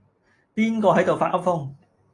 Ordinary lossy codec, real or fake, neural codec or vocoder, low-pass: AAC, 32 kbps; real; none; 10.8 kHz